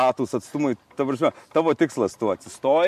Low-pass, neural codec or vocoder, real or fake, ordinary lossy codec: 14.4 kHz; none; real; MP3, 64 kbps